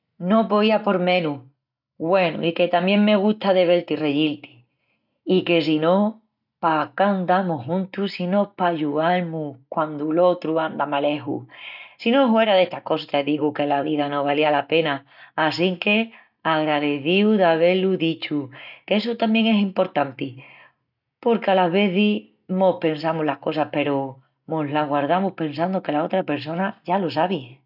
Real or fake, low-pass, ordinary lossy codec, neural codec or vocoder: real; 5.4 kHz; none; none